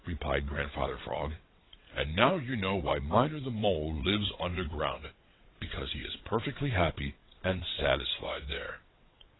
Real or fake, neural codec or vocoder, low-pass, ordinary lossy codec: fake; vocoder, 22.05 kHz, 80 mel bands, WaveNeXt; 7.2 kHz; AAC, 16 kbps